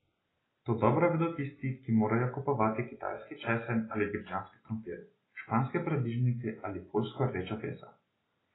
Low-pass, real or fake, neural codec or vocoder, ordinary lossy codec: 7.2 kHz; real; none; AAC, 16 kbps